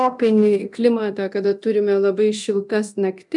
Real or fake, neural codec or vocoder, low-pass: fake; codec, 24 kHz, 0.9 kbps, DualCodec; 10.8 kHz